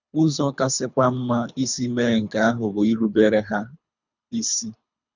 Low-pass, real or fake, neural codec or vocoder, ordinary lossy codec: 7.2 kHz; fake; codec, 24 kHz, 3 kbps, HILCodec; none